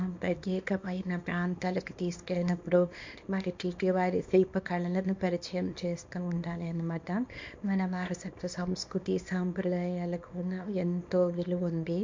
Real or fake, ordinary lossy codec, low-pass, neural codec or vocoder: fake; MP3, 48 kbps; 7.2 kHz; codec, 24 kHz, 0.9 kbps, WavTokenizer, small release